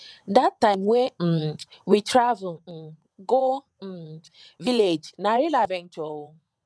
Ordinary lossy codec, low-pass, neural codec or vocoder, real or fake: none; none; vocoder, 22.05 kHz, 80 mel bands, WaveNeXt; fake